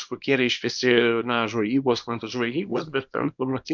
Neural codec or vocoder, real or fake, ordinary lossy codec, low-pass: codec, 24 kHz, 0.9 kbps, WavTokenizer, small release; fake; MP3, 64 kbps; 7.2 kHz